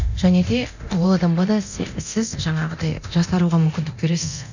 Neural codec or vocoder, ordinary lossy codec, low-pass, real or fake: codec, 24 kHz, 0.9 kbps, DualCodec; none; 7.2 kHz; fake